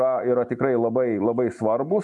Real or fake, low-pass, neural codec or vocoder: real; 10.8 kHz; none